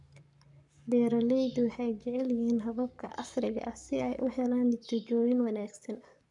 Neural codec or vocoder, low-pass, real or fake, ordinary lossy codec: codec, 44.1 kHz, 7.8 kbps, Pupu-Codec; 10.8 kHz; fake; none